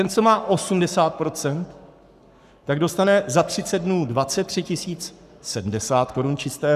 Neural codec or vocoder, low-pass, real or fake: codec, 44.1 kHz, 7.8 kbps, Pupu-Codec; 14.4 kHz; fake